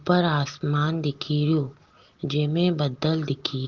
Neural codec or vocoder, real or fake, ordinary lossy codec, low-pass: none; real; Opus, 16 kbps; 7.2 kHz